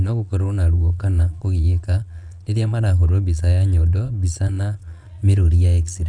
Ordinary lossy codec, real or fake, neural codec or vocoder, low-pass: none; fake; vocoder, 22.05 kHz, 80 mel bands, Vocos; 9.9 kHz